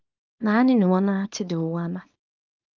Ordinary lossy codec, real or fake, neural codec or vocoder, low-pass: Opus, 24 kbps; fake; codec, 24 kHz, 0.9 kbps, WavTokenizer, small release; 7.2 kHz